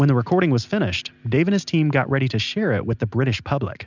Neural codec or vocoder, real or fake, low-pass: none; real; 7.2 kHz